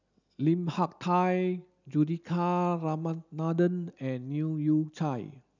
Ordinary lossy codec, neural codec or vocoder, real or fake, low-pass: none; none; real; 7.2 kHz